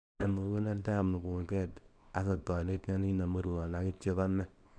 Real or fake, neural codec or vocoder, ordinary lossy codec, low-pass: fake; codec, 24 kHz, 0.9 kbps, WavTokenizer, small release; none; 9.9 kHz